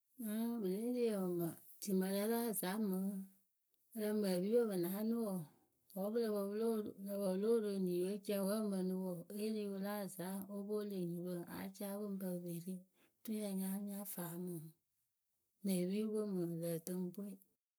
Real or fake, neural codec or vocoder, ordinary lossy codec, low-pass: fake; codec, 44.1 kHz, 7.8 kbps, Pupu-Codec; none; none